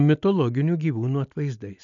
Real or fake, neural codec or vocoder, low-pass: real; none; 7.2 kHz